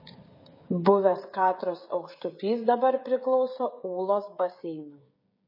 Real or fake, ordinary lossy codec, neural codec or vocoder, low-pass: fake; MP3, 24 kbps; codec, 16 kHz, 16 kbps, FreqCodec, smaller model; 5.4 kHz